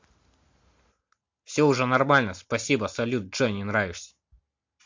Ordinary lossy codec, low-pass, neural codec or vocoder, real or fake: MP3, 48 kbps; 7.2 kHz; none; real